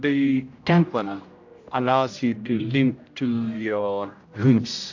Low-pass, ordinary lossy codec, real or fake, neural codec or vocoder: 7.2 kHz; AAC, 48 kbps; fake; codec, 16 kHz, 0.5 kbps, X-Codec, HuBERT features, trained on general audio